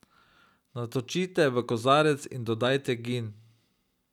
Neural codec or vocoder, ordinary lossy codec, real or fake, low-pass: none; none; real; 19.8 kHz